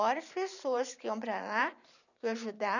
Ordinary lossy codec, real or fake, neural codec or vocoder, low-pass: none; fake; vocoder, 44.1 kHz, 128 mel bands every 512 samples, BigVGAN v2; 7.2 kHz